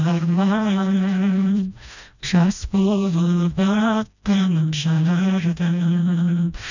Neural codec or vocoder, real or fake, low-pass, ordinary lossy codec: codec, 16 kHz, 1 kbps, FreqCodec, smaller model; fake; 7.2 kHz; none